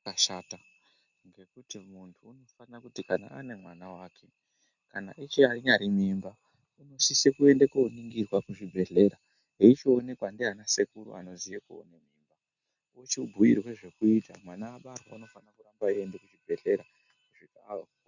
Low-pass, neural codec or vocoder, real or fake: 7.2 kHz; none; real